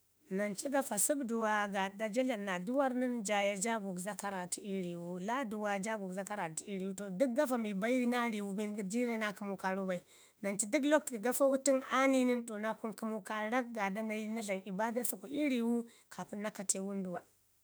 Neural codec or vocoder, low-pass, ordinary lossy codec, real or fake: autoencoder, 48 kHz, 32 numbers a frame, DAC-VAE, trained on Japanese speech; none; none; fake